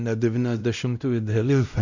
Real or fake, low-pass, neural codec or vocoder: fake; 7.2 kHz; codec, 16 kHz, 0.5 kbps, X-Codec, WavLM features, trained on Multilingual LibriSpeech